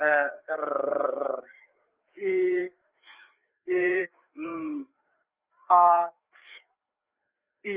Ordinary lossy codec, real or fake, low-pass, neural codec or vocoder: Opus, 32 kbps; fake; 3.6 kHz; codec, 16 kHz, 4 kbps, FreqCodec, larger model